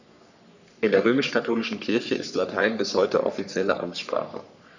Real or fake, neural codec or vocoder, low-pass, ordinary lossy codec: fake; codec, 44.1 kHz, 3.4 kbps, Pupu-Codec; 7.2 kHz; none